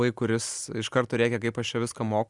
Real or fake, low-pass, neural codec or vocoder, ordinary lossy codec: real; 10.8 kHz; none; Opus, 64 kbps